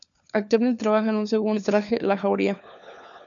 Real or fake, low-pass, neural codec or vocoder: fake; 7.2 kHz; codec, 16 kHz, 4 kbps, FunCodec, trained on LibriTTS, 50 frames a second